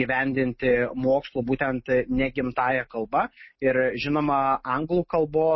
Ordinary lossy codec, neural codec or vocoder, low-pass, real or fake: MP3, 24 kbps; none; 7.2 kHz; real